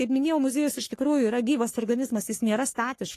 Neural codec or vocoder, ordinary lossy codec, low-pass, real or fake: codec, 44.1 kHz, 3.4 kbps, Pupu-Codec; AAC, 48 kbps; 14.4 kHz; fake